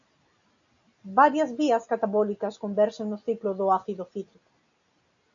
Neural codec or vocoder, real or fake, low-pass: none; real; 7.2 kHz